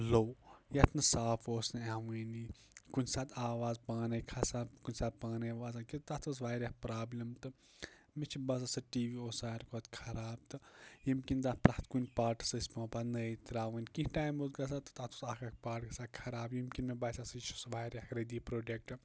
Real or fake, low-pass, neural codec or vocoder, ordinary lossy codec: real; none; none; none